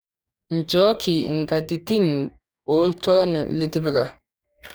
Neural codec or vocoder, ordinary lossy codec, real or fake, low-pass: codec, 44.1 kHz, 2.6 kbps, DAC; none; fake; none